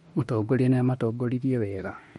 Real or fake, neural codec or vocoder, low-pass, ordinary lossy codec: fake; autoencoder, 48 kHz, 32 numbers a frame, DAC-VAE, trained on Japanese speech; 19.8 kHz; MP3, 48 kbps